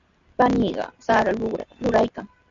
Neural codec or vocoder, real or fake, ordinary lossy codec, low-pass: none; real; MP3, 64 kbps; 7.2 kHz